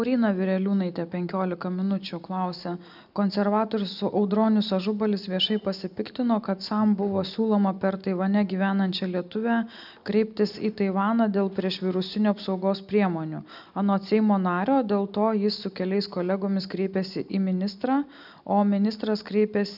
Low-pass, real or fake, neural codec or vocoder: 5.4 kHz; real; none